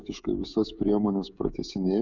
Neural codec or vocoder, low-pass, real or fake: codec, 16 kHz, 16 kbps, FreqCodec, smaller model; 7.2 kHz; fake